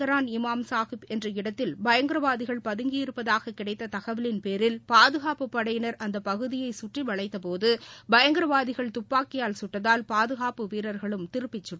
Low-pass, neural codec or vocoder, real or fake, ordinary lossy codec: none; none; real; none